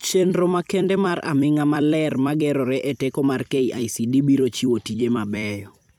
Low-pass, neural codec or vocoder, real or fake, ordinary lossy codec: 19.8 kHz; vocoder, 44.1 kHz, 128 mel bands every 256 samples, BigVGAN v2; fake; none